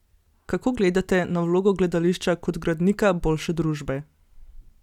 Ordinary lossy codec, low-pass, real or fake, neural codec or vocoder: none; 19.8 kHz; real; none